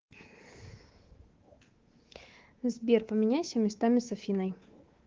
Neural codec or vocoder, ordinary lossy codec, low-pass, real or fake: codec, 24 kHz, 3.1 kbps, DualCodec; Opus, 16 kbps; 7.2 kHz; fake